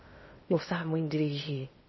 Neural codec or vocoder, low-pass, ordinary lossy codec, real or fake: codec, 16 kHz in and 24 kHz out, 0.6 kbps, FocalCodec, streaming, 4096 codes; 7.2 kHz; MP3, 24 kbps; fake